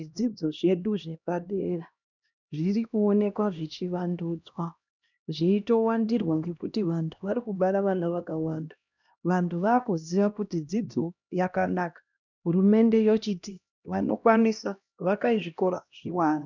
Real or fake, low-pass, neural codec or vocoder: fake; 7.2 kHz; codec, 16 kHz, 1 kbps, X-Codec, HuBERT features, trained on LibriSpeech